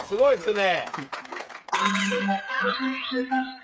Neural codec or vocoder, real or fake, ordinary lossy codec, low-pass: codec, 16 kHz, 8 kbps, FreqCodec, smaller model; fake; none; none